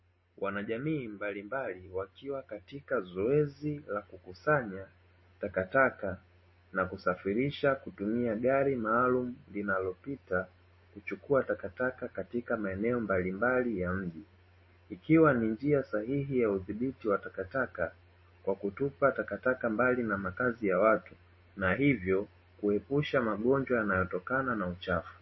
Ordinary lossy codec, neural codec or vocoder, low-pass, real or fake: MP3, 24 kbps; none; 7.2 kHz; real